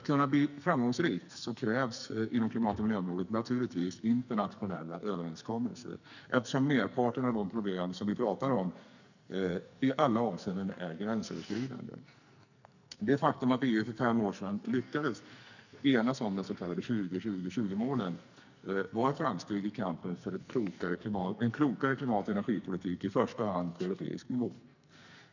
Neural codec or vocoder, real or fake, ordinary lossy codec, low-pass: codec, 44.1 kHz, 2.6 kbps, SNAC; fake; none; 7.2 kHz